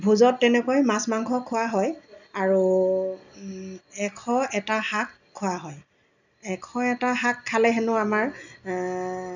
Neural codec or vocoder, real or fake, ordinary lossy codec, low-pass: none; real; none; 7.2 kHz